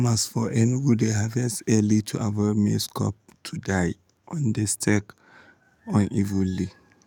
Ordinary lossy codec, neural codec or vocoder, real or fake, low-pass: none; codec, 44.1 kHz, 7.8 kbps, DAC; fake; 19.8 kHz